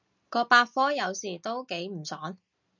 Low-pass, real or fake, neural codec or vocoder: 7.2 kHz; real; none